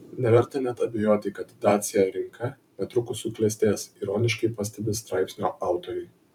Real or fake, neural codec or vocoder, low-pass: fake; vocoder, 44.1 kHz, 128 mel bands every 512 samples, BigVGAN v2; 19.8 kHz